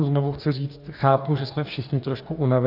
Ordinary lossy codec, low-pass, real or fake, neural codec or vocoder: AAC, 48 kbps; 5.4 kHz; fake; codec, 44.1 kHz, 2.6 kbps, DAC